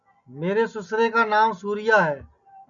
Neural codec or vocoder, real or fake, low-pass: none; real; 7.2 kHz